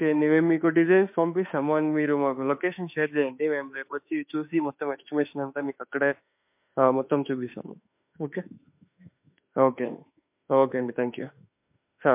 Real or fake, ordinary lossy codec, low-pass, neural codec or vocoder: fake; MP3, 32 kbps; 3.6 kHz; autoencoder, 48 kHz, 32 numbers a frame, DAC-VAE, trained on Japanese speech